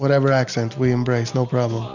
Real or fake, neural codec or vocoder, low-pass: real; none; 7.2 kHz